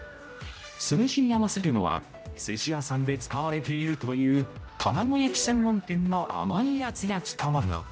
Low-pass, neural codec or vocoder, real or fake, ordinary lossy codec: none; codec, 16 kHz, 0.5 kbps, X-Codec, HuBERT features, trained on general audio; fake; none